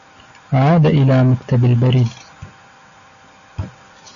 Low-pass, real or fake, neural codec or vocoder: 7.2 kHz; real; none